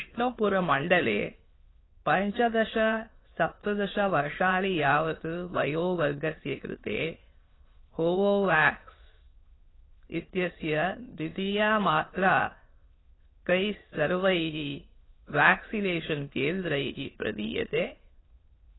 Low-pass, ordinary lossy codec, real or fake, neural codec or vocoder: 7.2 kHz; AAC, 16 kbps; fake; autoencoder, 22.05 kHz, a latent of 192 numbers a frame, VITS, trained on many speakers